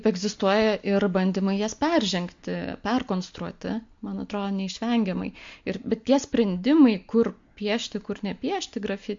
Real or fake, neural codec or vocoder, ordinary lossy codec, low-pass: real; none; MP3, 48 kbps; 7.2 kHz